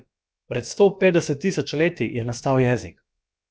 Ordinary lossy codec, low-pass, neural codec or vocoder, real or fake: none; none; codec, 16 kHz, about 1 kbps, DyCAST, with the encoder's durations; fake